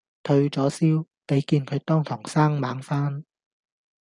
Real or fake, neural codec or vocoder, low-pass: real; none; 10.8 kHz